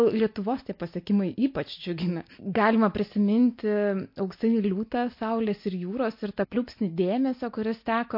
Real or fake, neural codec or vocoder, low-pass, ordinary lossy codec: real; none; 5.4 kHz; MP3, 32 kbps